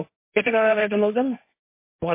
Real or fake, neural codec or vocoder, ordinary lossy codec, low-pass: fake; codec, 16 kHz, 1.1 kbps, Voila-Tokenizer; MP3, 24 kbps; 3.6 kHz